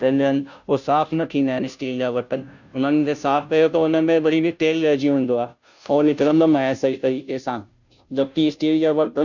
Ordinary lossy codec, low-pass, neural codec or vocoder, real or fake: none; 7.2 kHz; codec, 16 kHz, 0.5 kbps, FunCodec, trained on Chinese and English, 25 frames a second; fake